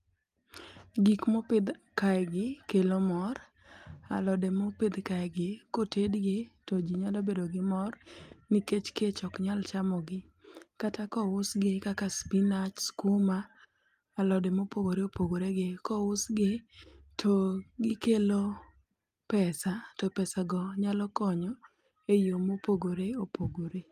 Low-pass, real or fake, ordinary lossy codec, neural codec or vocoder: 14.4 kHz; real; Opus, 32 kbps; none